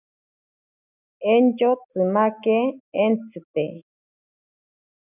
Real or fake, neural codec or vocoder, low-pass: real; none; 3.6 kHz